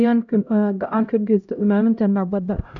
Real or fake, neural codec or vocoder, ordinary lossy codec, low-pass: fake; codec, 16 kHz, 0.5 kbps, X-Codec, HuBERT features, trained on LibriSpeech; none; 7.2 kHz